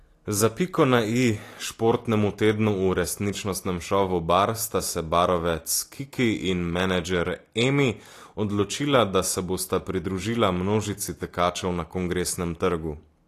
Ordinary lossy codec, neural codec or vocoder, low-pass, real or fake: AAC, 48 kbps; none; 14.4 kHz; real